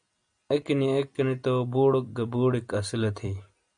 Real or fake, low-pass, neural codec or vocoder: real; 9.9 kHz; none